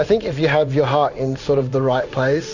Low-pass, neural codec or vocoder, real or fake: 7.2 kHz; codec, 16 kHz in and 24 kHz out, 1 kbps, XY-Tokenizer; fake